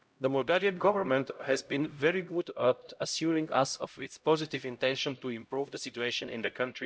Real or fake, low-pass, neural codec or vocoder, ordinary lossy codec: fake; none; codec, 16 kHz, 0.5 kbps, X-Codec, HuBERT features, trained on LibriSpeech; none